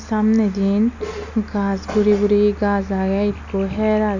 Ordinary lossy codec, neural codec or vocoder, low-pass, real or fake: none; none; 7.2 kHz; real